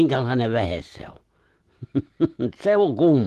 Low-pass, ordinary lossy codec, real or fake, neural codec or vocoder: 14.4 kHz; Opus, 16 kbps; fake; autoencoder, 48 kHz, 128 numbers a frame, DAC-VAE, trained on Japanese speech